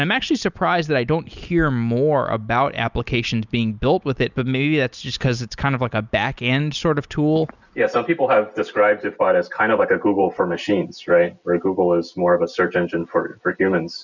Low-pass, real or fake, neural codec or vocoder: 7.2 kHz; real; none